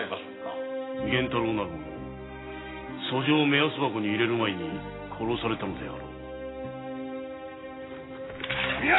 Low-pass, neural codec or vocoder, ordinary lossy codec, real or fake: 7.2 kHz; none; AAC, 16 kbps; real